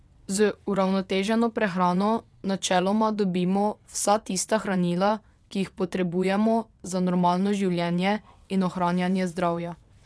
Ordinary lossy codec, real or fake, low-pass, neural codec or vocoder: none; fake; none; vocoder, 22.05 kHz, 80 mel bands, WaveNeXt